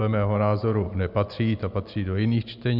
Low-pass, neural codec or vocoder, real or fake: 5.4 kHz; none; real